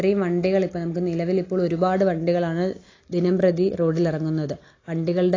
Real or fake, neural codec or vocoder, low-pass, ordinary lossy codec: real; none; 7.2 kHz; AAC, 32 kbps